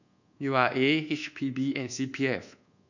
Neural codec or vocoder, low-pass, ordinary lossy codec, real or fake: codec, 24 kHz, 1.2 kbps, DualCodec; 7.2 kHz; none; fake